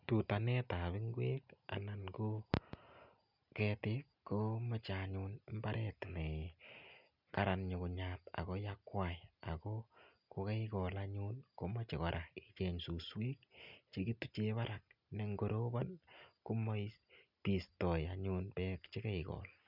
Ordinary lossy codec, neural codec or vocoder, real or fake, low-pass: none; none; real; 5.4 kHz